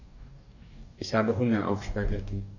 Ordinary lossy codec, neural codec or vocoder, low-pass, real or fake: none; codec, 44.1 kHz, 2.6 kbps, DAC; 7.2 kHz; fake